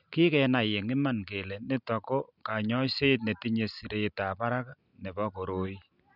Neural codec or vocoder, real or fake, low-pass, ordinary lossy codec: none; real; 5.4 kHz; none